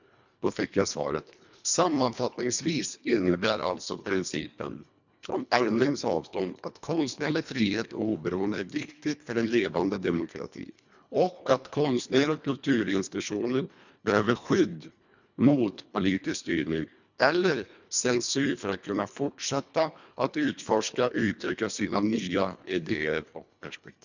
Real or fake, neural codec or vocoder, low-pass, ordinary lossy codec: fake; codec, 24 kHz, 1.5 kbps, HILCodec; 7.2 kHz; none